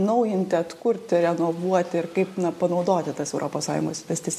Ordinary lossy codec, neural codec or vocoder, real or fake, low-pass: MP3, 64 kbps; none; real; 14.4 kHz